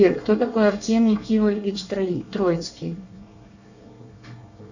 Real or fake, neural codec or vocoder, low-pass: fake; codec, 24 kHz, 1 kbps, SNAC; 7.2 kHz